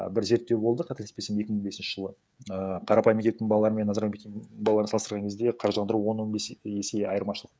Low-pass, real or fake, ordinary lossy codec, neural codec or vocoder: none; fake; none; codec, 16 kHz, 16 kbps, FreqCodec, smaller model